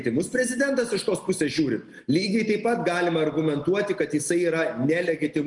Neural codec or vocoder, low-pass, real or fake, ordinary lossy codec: none; 10.8 kHz; real; Opus, 32 kbps